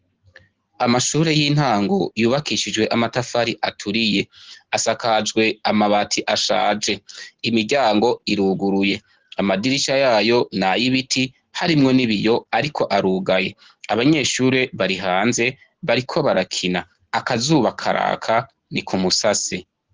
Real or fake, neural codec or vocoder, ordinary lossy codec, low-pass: real; none; Opus, 16 kbps; 7.2 kHz